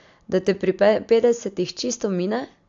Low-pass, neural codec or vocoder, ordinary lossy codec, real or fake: 7.2 kHz; none; AAC, 64 kbps; real